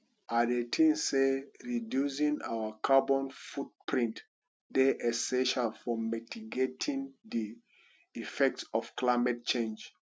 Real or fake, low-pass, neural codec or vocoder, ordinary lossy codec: real; none; none; none